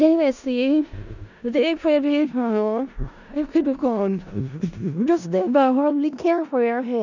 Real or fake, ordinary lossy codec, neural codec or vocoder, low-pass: fake; none; codec, 16 kHz in and 24 kHz out, 0.4 kbps, LongCat-Audio-Codec, four codebook decoder; 7.2 kHz